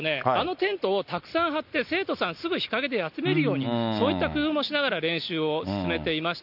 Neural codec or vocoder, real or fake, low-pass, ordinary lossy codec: none; real; 5.4 kHz; none